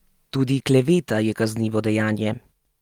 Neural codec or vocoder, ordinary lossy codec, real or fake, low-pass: none; Opus, 32 kbps; real; 19.8 kHz